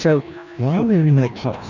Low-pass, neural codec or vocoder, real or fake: 7.2 kHz; codec, 16 kHz, 1 kbps, FreqCodec, larger model; fake